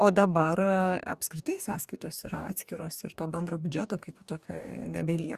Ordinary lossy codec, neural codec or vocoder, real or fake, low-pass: Opus, 64 kbps; codec, 44.1 kHz, 2.6 kbps, DAC; fake; 14.4 kHz